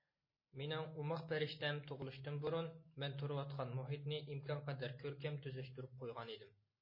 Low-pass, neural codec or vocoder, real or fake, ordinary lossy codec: 5.4 kHz; none; real; MP3, 24 kbps